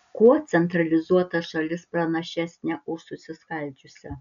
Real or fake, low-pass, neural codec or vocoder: real; 7.2 kHz; none